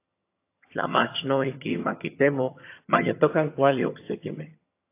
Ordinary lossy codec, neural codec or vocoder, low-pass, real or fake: AAC, 24 kbps; vocoder, 22.05 kHz, 80 mel bands, HiFi-GAN; 3.6 kHz; fake